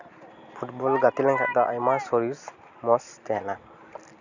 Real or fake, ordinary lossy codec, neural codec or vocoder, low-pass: real; none; none; 7.2 kHz